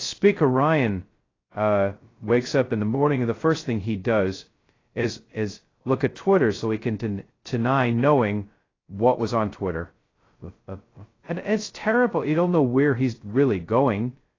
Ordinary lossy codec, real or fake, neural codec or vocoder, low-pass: AAC, 32 kbps; fake; codec, 16 kHz, 0.2 kbps, FocalCodec; 7.2 kHz